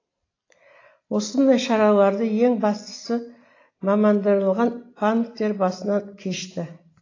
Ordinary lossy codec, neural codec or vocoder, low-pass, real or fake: AAC, 32 kbps; none; 7.2 kHz; real